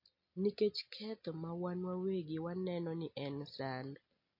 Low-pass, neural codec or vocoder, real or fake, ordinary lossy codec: 5.4 kHz; none; real; MP3, 32 kbps